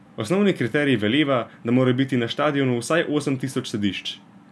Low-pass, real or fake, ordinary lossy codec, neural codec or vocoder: none; real; none; none